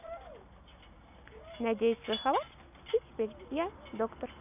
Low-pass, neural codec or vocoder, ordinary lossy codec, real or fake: 3.6 kHz; none; none; real